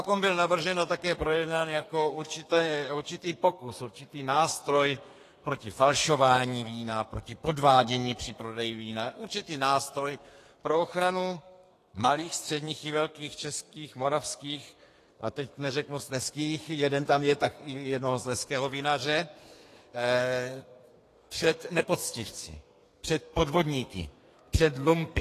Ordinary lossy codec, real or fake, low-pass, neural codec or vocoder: AAC, 48 kbps; fake; 14.4 kHz; codec, 32 kHz, 1.9 kbps, SNAC